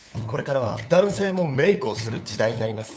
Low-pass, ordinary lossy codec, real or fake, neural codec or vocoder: none; none; fake; codec, 16 kHz, 8 kbps, FunCodec, trained on LibriTTS, 25 frames a second